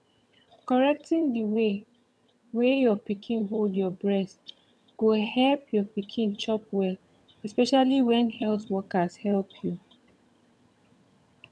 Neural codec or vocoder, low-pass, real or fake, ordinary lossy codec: vocoder, 22.05 kHz, 80 mel bands, HiFi-GAN; none; fake; none